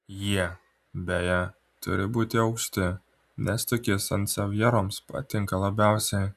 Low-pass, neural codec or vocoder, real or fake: 14.4 kHz; none; real